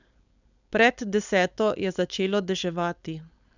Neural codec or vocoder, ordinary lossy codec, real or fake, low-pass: codec, 16 kHz, 4.8 kbps, FACodec; none; fake; 7.2 kHz